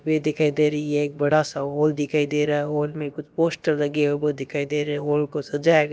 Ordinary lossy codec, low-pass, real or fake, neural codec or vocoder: none; none; fake; codec, 16 kHz, about 1 kbps, DyCAST, with the encoder's durations